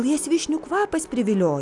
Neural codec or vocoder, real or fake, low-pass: none; real; 10.8 kHz